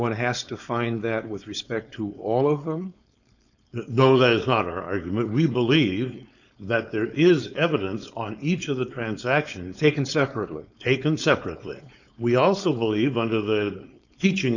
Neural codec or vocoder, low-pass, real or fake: codec, 16 kHz, 4.8 kbps, FACodec; 7.2 kHz; fake